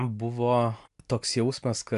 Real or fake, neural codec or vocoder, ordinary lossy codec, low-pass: real; none; MP3, 96 kbps; 10.8 kHz